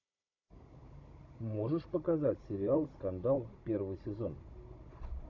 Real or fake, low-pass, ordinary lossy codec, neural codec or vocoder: fake; 7.2 kHz; AAC, 48 kbps; codec, 16 kHz, 16 kbps, FunCodec, trained on Chinese and English, 50 frames a second